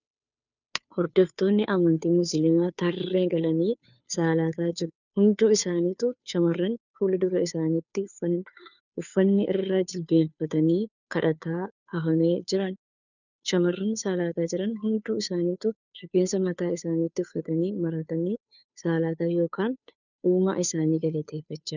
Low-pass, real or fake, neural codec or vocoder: 7.2 kHz; fake; codec, 16 kHz, 2 kbps, FunCodec, trained on Chinese and English, 25 frames a second